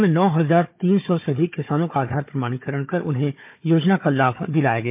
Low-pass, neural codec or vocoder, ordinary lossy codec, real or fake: 3.6 kHz; codec, 16 kHz, 4 kbps, FunCodec, trained on Chinese and English, 50 frames a second; MP3, 32 kbps; fake